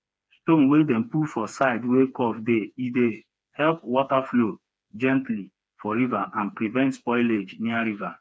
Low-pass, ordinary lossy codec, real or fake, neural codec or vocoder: none; none; fake; codec, 16 kHz, 4 kbps, FreqCodec, smaller model